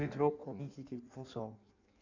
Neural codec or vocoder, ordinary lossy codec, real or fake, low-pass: codec, 16 kHz in and 24 kHz out, 1.1 kbps, FireRedTTS-2 codec; none; fake; 7.2 kHz